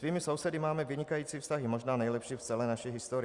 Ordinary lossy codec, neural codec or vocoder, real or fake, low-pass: Opus, 64 kbps; vocoder, 44.1 kHz, 128 mel bands every 256 samples, BigVGAN v2; fake; 10.8 kHz